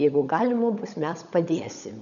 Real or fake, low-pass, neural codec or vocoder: fake; 7.2 kHz; codec, 16 kHz, 16 kbps, FunCodec, trained on LibriTTS, 50 frames a second